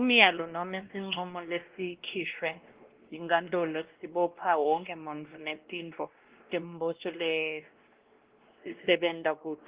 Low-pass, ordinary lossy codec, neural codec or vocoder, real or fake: 3.6 kHz; Opus, 16 kbps; codec, 16 kHz, 1 kbps, X-Codec, WavLM features, trained on Multilingual LibriSpeech; fake